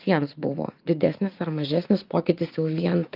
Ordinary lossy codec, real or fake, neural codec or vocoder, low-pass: Opus, 24 kbps; fake; vocoder, 44.1 kHz, 80 mel bands, Vocos; 5.4 kHz